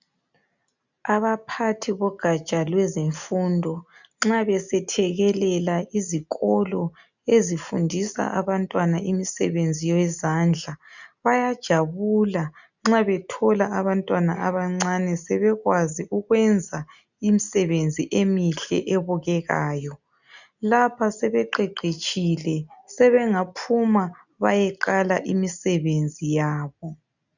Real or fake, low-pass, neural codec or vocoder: real; 7.2 kHz; none